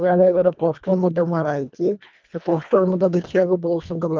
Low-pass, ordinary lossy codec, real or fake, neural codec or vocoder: 7.2 kHz; Opus, 24 kbps; fake; codec, 24 kHz, 1.5 kbps, HILCodec